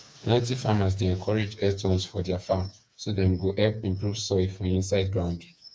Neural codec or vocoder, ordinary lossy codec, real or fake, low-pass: codec, 16 kHz, 4 kbps, FreqCodec, smaller model; none; fake; none